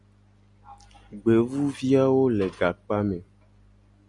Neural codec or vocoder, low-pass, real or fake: none; 10.8 kHz; real